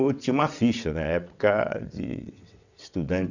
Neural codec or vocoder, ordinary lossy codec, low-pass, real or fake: vocoder, 22.05 kHz, 80 mel bands, WaveNeXt; none; 7.2 kHz; fake